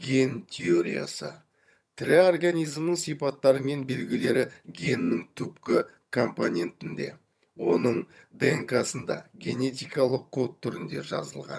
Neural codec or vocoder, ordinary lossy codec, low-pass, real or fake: vocoder, 22.05 kHz, 80 mel bands, HiFi-GAN; none; none; fake